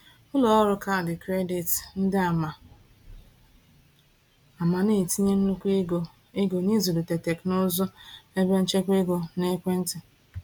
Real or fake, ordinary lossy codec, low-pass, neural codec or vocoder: real; none; 19.8 kHz; none